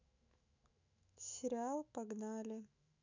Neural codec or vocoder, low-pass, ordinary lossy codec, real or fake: autoencoder, 48 kHz, 128 numbers a frame, DAC-VAE, trained on Japanese speech; 7.2 kHz; none; fake